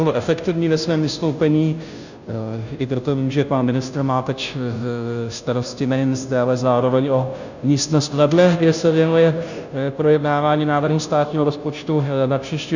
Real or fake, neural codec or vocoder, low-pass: fake; codec, 16 kHz, 0.5 kbps, FunCodec, trained on Chinese and English, 25 frames a second; 7.2 kHz